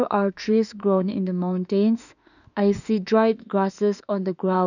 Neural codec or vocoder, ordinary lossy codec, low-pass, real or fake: autoencoder, 48 kHz, 32 numbers a frame, DAC-VAE, trained on Japanese speech; none; 7.2 kHz; fake